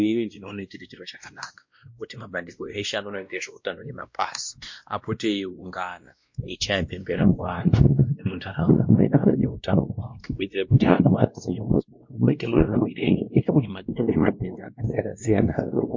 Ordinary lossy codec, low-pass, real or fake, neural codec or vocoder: MP3, 48 kbps; 7.2 kHz; fake; codec, 16 kHz, 1 kbps, X-Codec, WavLM features, trained on Multilingual LibriSpeech